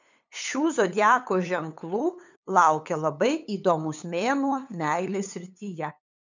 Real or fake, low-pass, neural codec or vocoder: fake; 7.2 kHz; codec, 16 kHz, 8 kbps, FunCodec, trained on LibriTTS, 25 frames a second